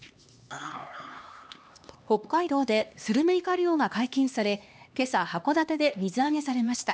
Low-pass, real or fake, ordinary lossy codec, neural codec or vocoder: none; fake; none; codec, 16 kHz, 2 kbps, X-Codec, HuBERT features, trained on LibriSpeech